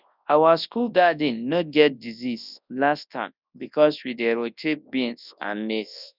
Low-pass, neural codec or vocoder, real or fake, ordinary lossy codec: 5.4 kHz; codec, 24 kHz, 0.9 kbps, WavTokenizer, large speech release; fake; none